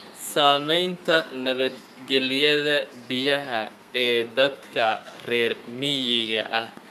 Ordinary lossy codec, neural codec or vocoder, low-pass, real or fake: none; codec, 32 kHz, 1.9 kbps, SNAC; 14.4 kHz; fake